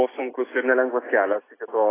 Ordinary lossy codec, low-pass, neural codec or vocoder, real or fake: AAC, 16 kbps; 3.6 kHz; none; real